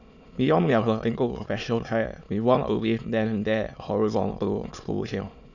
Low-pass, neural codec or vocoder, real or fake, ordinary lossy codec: 7.2 kHz; autoencoder, 22.05 kHz, a latent of 192 numbers a frame, VITS, trained on many speakers; fake; none